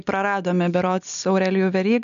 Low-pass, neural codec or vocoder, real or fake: 7.2 kHz; none; real